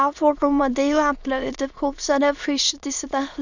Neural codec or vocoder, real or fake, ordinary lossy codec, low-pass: autoencoder, 22.05 kHz, a latent of 192 numbers a frame, VITS, trained on many speakers; fake; none; 7.2 kHz